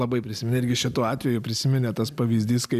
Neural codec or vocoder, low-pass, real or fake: none; 14.4 kHz; real